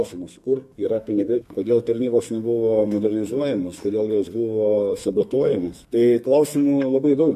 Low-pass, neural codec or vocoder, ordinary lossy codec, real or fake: 14.4 kHz; codec, 32 kHz, 1.9 kbps, SNAC; MP3, 64 kbps; fake